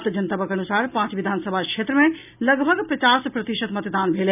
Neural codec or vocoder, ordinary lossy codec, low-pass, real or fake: none; none; 3.6 kHz; real